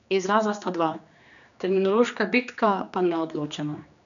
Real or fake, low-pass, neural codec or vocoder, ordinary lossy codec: fake; 7.2 kHz; codec, 16 kHz, 2 kbps, X-Codec, HuBERT features, trained on general audio; none